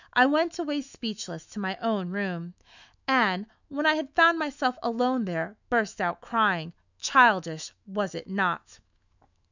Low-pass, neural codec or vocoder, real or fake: 7.2 kHz; autoencoder, 48 kHz, 128 numbers a frame, DAC-VAE, trained on Japanese speech; fake